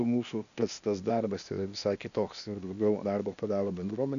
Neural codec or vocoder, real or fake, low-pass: codec, 16 kHz, 0.8 kbps, ZipCodec; fake; 7.2 kHz